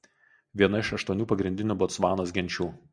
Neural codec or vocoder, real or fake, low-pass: none; real; 9.9 kHz